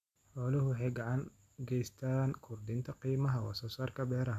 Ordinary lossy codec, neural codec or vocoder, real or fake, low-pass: MP3, 96 kbps; none; real; 14.4 kHz